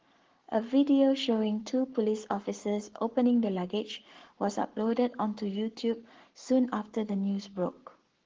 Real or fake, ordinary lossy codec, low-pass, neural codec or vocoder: fake; Opus, 16 kbps; 7.2 kHz; codec, 44.1 kHz, 7.8 kbps, Pupu-Codec